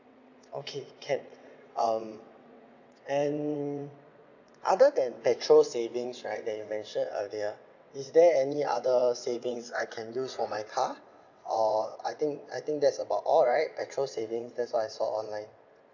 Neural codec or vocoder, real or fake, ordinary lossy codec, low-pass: vocoder, 22.05 kHz, 80 mel bands, WaveNeXt; fake; none; 7.2 kHz